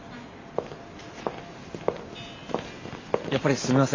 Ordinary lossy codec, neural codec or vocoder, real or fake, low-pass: AAC, 32 kbps; none; real; 7.2 kHz